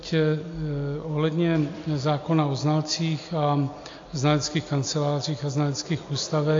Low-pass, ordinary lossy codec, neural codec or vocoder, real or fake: 7.2 kHz; AAC, 48 kbps; none; real